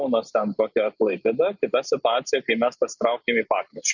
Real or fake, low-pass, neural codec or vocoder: real; 7.2 kHz; none